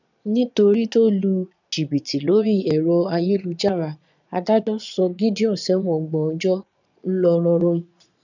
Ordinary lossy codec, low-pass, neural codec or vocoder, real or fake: none; 7.2 kHz; vocoder, 22.05 kHz, 80 mel bands, Vocos; fake